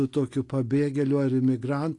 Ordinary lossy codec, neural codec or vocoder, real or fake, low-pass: AAC, 48 kbps; none; real; 10.8 kHz